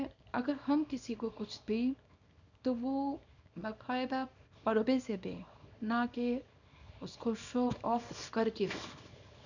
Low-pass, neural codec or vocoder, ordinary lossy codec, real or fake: 7.2 kHz; codec, 24 kHz, 0.9 kbps, WavTokenizer, small release; none; fake